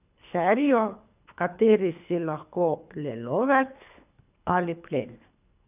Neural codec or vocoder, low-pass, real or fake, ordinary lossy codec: codec, 24 kHz, 3 kbps, HILCodec; 3.6 kHz; fake; none